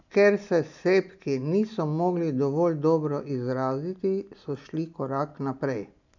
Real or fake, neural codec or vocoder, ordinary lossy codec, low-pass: real; none; none; 7.2 kHz